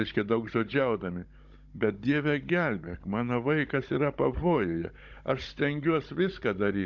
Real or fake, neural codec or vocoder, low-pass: fake; codec, 16 kHz, 16 kbps, FunCodec, trained on Chinese and English, 50 frames a second; 7.2 kHz